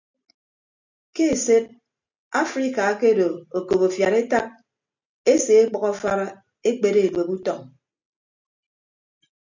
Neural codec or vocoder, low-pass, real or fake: none; 7.2 kHz; real